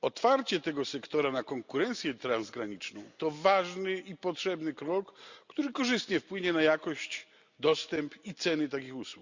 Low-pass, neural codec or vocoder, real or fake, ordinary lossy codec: 7.2 kHz; none; real; Opus, 64 kbps